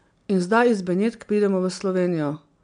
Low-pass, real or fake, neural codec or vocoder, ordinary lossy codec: 9.9 kHz; real; none; none